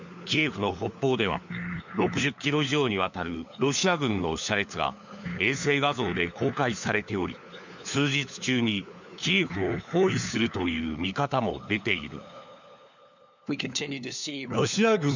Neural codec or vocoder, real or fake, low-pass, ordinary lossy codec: codec, 16 kHz, 4 kbps, FunCodec, trained on LibriTTS, 50 frames a second; fake; 7.2 kHz; none